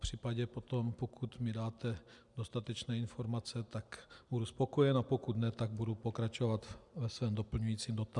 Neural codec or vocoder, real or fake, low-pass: none; real; 10.8 kHz